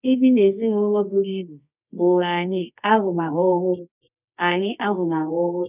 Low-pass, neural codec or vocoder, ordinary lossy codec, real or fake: 3.6 kHz; codec, 24 kHz, 0.9 kbps, WavTokenizer, medium music audio release; none; fake